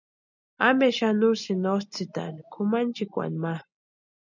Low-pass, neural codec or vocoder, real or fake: 7.2 kHz; none; real